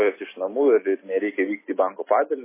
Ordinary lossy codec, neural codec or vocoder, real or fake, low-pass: MP3, 16 kbps; none; real; 3.6 kHz